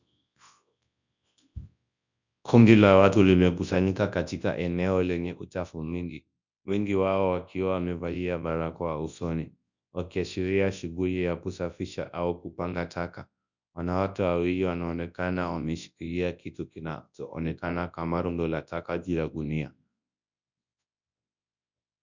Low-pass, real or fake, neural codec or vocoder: 7.2 kHz; fake; codec, 24 kHz, 0.9 kbps, WavTokenizer, large speech release